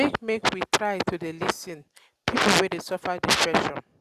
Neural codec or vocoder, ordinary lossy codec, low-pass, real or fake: none; Opus, 64 kbps; 14.4 kHz; real